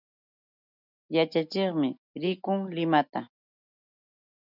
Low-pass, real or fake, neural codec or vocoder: 5.4 kHz; real; none